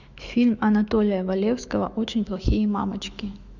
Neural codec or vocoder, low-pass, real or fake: autoencoder, 48 kHz, 128 numbers a frame, DAC-VAE, trained on Japanese speech; 7.2 kHz; fake